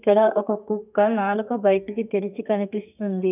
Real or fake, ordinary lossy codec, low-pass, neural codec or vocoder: fake; none; 3.6 kHz; codec, 32 kHz, 1.9 kbps, SNAC